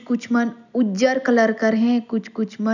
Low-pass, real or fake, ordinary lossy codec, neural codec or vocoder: 7.2 kHz; real; none; none